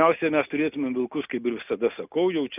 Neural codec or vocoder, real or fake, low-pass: none; real; 3.6 kHz